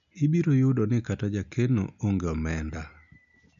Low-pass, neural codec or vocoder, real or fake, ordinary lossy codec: 7.2 kHz; none; real; none